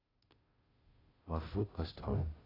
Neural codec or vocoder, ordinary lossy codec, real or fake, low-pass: codec, 16 kHz, 0.5 kbps, FunCodec, trained on Chinese and English, 25 frames a second; AAC, 24 kbps; fake; 5.4 kHz